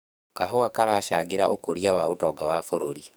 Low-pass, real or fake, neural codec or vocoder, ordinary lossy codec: none; fake; codec, 44.1 kHz, 2.6 kbps, SNAC; none